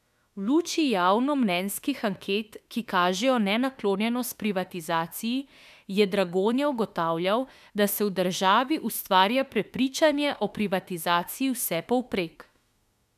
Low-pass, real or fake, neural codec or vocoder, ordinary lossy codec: 14.4 kHz; fake; autoencoder, 48 kHz, 32 numbers a frame, DAC-VAE, trained on Japanese speech; none